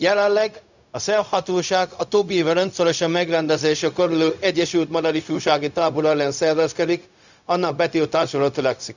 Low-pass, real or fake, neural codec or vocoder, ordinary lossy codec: 7.2 kHz; fake; codec, 16 kHz, 0.4 kbps, LongCat-Audio-Codec; none